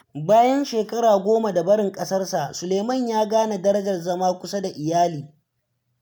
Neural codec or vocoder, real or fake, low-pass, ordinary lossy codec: none; real; none; none